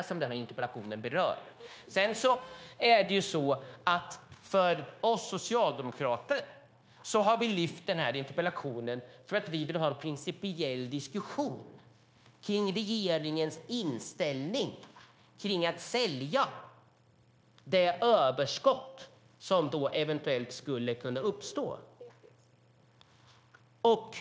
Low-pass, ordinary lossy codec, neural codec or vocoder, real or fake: none; none; codec, 16 kHz, 0.9 kbps, LongCat-Audio-Codec; fake